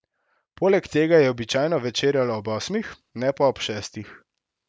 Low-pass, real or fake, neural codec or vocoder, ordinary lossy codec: none; real; none; none